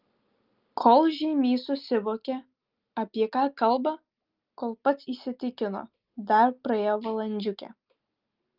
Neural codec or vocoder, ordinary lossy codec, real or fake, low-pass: none; Opus, 24 kbps; real; 5.4 kHz